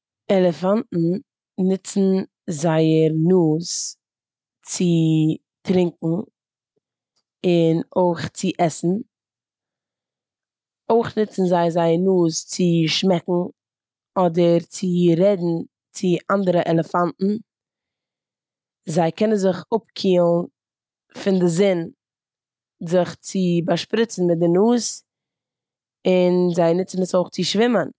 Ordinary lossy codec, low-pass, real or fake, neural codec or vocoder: none; none; real; none